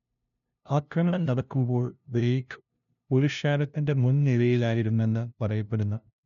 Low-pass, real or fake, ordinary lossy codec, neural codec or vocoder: 7.2 kHz; fake; none; codec, 16 kHz, 0.5 kbps, FunCodec, trained on LibriTTS, 25 frames a second